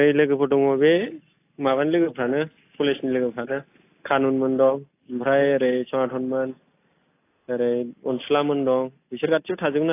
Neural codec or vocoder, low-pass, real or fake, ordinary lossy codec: none; 3.6 kHz; real; AAC, 24 kbps